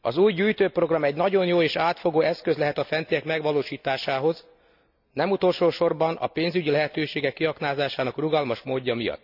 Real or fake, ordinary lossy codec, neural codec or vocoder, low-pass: real; none; none; 5.4 kHz